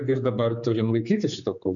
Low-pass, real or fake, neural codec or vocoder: 7.2 kHz; fake; codec, 16 kHz, 4 kbps, X-Codec, HuBERT features, trained on general audio